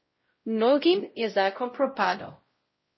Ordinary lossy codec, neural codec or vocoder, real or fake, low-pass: MP3, 24 kbps; codec, 16 kHz, 0.5 kbps, X-Codec, WavLM features, trained on Multilingual LibriSpeech; fake; 7.2 kHz